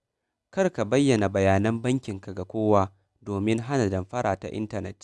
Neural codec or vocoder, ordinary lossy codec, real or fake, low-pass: none; none; real; none